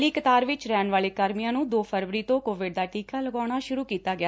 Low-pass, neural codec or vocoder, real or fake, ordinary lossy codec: none; none; real; none